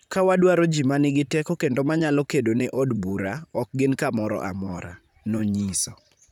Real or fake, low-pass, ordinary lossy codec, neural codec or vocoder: fake; 19.8 kHz; none; vocoder, 44.1 kHz, 128 mel bands, Pupu-Vocoder